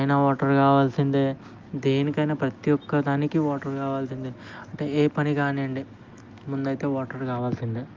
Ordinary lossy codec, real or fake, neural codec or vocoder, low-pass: Opus, 32 kbps; real; none; 7.2 kHz